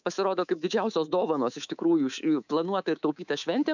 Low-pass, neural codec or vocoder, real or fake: 7.2 kHz; codec, 24 kHz, 3.1 kbps, DualCodec; fake